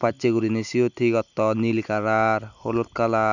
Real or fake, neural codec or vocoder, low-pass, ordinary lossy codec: real; none; 7.2 kHz; none